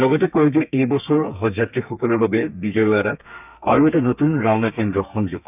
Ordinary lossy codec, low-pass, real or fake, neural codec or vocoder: none; 3.6 kHz; fake; codec, 32 kHz, 1.9 kbps, SNAC